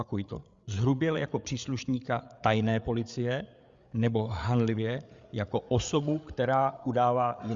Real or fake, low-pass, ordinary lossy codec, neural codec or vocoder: fake; 7.2 kHz; Opus, 64 kbps; codec, 16 kHz, 8 kbps, FreqCodec, larger model